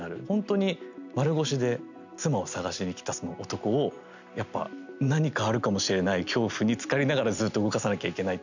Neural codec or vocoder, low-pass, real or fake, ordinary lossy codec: none; 7.2 kHz; real; none